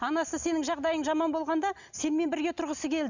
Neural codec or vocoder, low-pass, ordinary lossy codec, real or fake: none; 7.2 kHz; none; real